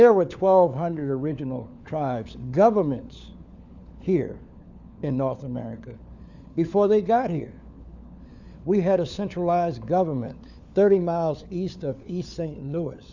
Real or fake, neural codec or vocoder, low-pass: fake; codec, 16 kHz, 4 kbps, FunCodec, trained on LibriTTS, 50 frames a second; 7.2 kHz